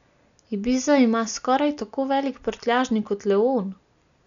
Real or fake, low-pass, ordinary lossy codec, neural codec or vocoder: real; 7.2 kHz; none; none